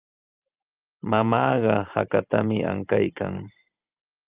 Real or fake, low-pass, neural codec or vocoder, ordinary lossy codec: fake; 3.6 kHz; vocoder, 44.1 kHz, 128 mel bands every 512 samples, BigVGAN v2; Opus, 32 kbps